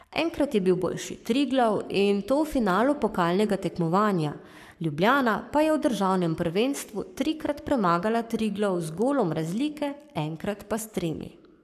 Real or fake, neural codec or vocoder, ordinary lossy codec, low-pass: fake; codec, 44.1 kHz, 7.8 kbps, Pupu-Codec; AAC, 96 kbps; 14.4 kHz